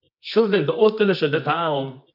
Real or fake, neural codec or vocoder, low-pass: fake; codec, 24 kHz, 0.9 kbps, WavTokenizer, medium music audio release; 5.4 kHz